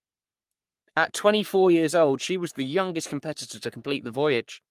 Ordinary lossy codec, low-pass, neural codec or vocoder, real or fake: Opus, 32 kbps; 14.4 kHz; codec, 44.1 kHz, 3.4 kbps, Pupu-Codec; fake